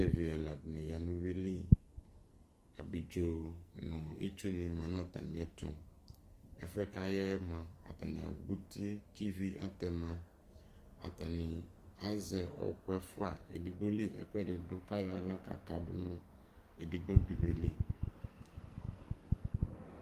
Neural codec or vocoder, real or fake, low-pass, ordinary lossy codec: codec, 44.1 kHz, 2.6 kbps, SNAC; fake; 14.4 kHz; Opus, 32 kbps